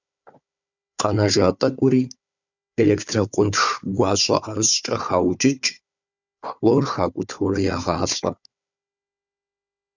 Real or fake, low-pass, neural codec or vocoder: fake; 7.2 kHz; codec, 16 kHz, 4 kbps, FunCodec, trained on Chinese and English, 50 frames a second